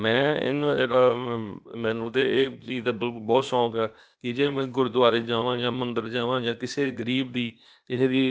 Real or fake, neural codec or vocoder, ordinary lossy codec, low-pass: fake; codec, 16 kHz, 0.8 kbps, ZipCodec; none; none